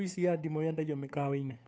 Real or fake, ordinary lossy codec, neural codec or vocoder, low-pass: fake; none; codec, 16 kHz, 2 kbps, FunCodec, trained on Chinese and English, 25 frames a second; none